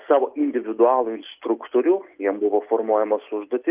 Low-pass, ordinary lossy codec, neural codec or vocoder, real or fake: 3.6 kHz; Opus, 24 kbps; none; real